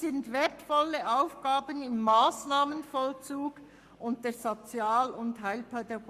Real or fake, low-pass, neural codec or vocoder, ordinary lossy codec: fake; 14.4 kHz; codec, 44.1 kHz, 7.8 kbps, Pupu-Codec; Opus, 64 kbps